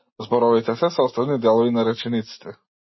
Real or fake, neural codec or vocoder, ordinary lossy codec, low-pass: real; none; MP3, 24 kbps; 7.2 kHz